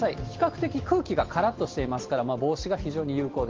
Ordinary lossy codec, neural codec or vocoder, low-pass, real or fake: Opus, 16 kbps; none; 7.2 kHz; real